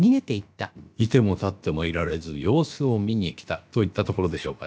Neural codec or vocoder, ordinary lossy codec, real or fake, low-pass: codec, 16 kHz, about 1 kbps, DyCAST, with the encoder's durations; none; fake; none